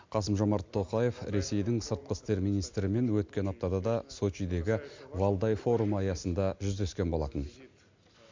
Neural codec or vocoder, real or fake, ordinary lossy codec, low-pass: none; real; MP3, 64 kbps; 7.2 kHz